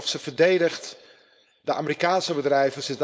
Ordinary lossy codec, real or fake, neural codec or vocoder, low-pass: none; fake; codec, 16 kHz, 4.8 kbps, FACodec; none